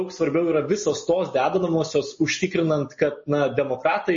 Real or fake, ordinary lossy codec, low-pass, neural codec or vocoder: real; MP3, 32 kbps; 7.2 kHz; none